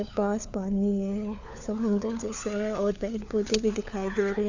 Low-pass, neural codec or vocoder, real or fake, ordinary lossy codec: 7.2 kHz; codec, 16 kHz, 4 kbps, FunCodec, trained on LibriTTS, 50 frames a second; fake; none